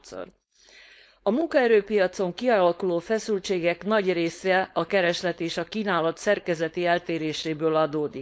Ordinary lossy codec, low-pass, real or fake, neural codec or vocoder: none; none; fake; codec, 16 kHz, 4.8 kbps, FACodec